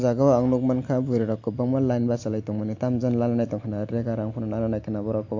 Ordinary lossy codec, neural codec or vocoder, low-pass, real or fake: MP3, 48 kbps; none; 7.2 kHz; real